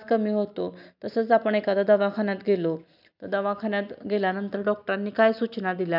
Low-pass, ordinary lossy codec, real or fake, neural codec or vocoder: 5.4 kHz; none; real; none